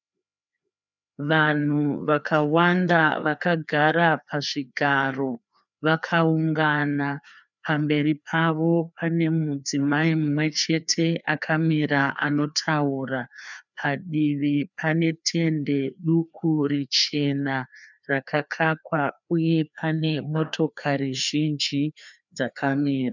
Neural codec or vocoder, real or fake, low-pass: codec, 16 kHz, 2 kbps, FreqCodec, larger model; fake; 7.2 kHz